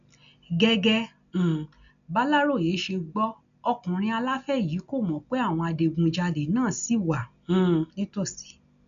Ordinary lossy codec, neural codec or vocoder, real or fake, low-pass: none; none; real; 7.2 kHz